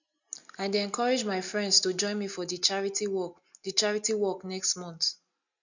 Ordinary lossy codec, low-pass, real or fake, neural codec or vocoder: none; 7.2 kHz; real; none